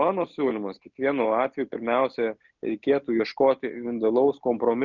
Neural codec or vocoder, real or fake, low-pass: none; real; 7.2 kHz